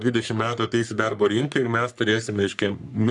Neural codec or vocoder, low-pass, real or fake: codec, 44.1 kHz, 3.4 kbps, Pupu-Codec; 10.8 kHz; fake